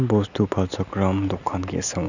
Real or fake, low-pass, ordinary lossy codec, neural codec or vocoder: real; 7.2 kHz; none; none